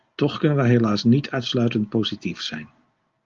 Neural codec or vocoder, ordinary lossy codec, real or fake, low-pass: none; Opus, 32 kbps; real; 7.2 kHz